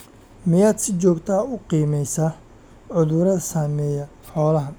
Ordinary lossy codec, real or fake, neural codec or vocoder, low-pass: none; real; none; none